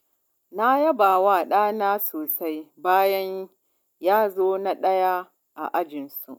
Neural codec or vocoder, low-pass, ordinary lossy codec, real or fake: none; none; none; real